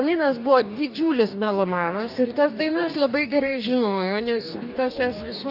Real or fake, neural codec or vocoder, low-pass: fake; codec, 44.1 kHz, 2.6 kbps, DAC; 5.4 kHz